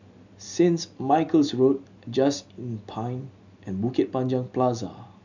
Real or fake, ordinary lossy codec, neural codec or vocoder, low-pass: real; none; none; 7.2 kHz